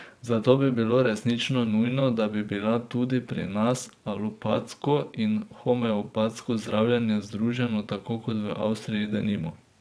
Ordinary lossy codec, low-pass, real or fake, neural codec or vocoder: none; none; fake; vocoder, 22.05 kHz, 80 mel bands, WaveNeXt